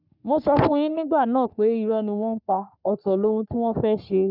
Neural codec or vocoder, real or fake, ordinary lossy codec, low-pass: codec, 44.1 kHz, 3.4 kbps, Pupu-Codec; fake; none; 5.4 kHz